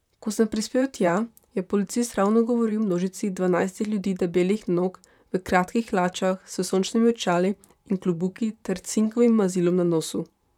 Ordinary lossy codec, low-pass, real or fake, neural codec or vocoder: none; 19.8 kHz; fake; vocoder, 44.1 kHz, 128 mel bands, Pupu-Vocoder